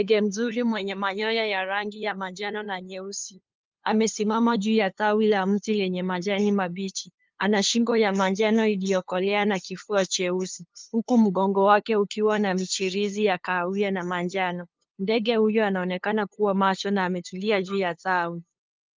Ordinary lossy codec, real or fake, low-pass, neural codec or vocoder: Opus, 24 kbps; fake; 7.2 kHz; codec, 16 kHz, 2 kbps, FunCodec, trained on LibriTTS, 25 frames a second